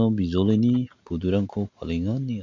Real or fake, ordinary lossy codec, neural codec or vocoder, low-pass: real; MP3, 48 kbps; none; 7.2 kHz